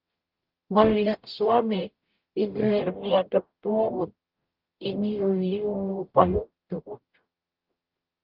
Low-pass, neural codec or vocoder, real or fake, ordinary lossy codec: 5.4 kHz; codec, 44.1 kHz, 0.9 kbps, DAC; fake; Opus, 32 kbps